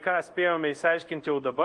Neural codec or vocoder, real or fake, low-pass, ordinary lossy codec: codec, 24 kHz, 0.5 kbps, DualCodec; fake; 10.8 kHz; Opus, 24 kbps